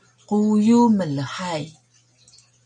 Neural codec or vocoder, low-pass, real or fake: none; 9.9 kHz; real